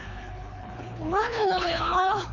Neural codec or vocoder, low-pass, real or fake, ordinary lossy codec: codec, 24 kHz, 3 kbps, HILCodec; 7.2 kHz; fake; none